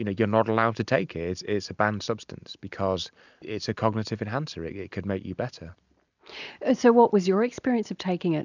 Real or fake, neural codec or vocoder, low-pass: real; none; 7.2 kHz